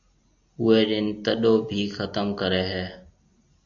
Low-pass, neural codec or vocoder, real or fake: 7.2 kHz; none; real